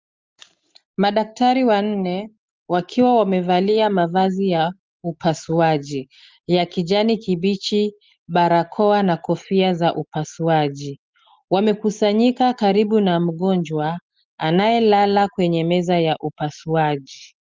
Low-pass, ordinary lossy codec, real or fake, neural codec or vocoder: 7.2 kHz; Opus, 32 kbps; real; none